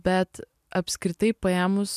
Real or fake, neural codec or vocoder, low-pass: real; none; 14.4 kHz